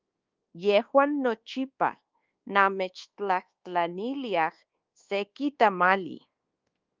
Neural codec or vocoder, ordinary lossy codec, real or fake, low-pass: codec, 24 kHz, 1.2 kbps, DualCodec; Opus, 32 kbps; fake; 7.2 kHz